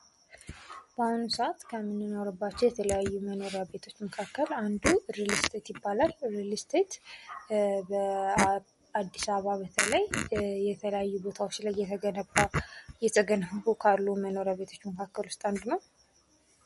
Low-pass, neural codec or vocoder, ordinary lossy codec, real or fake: 19.8 kHz; none; MP3, 48 kbps; real